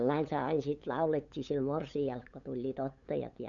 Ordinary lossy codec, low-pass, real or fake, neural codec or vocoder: none; 7.2 kHz; fake; codec, 16 kHz, 8 kbps, FunCodec, trained on LibriTTS, 25 frames a second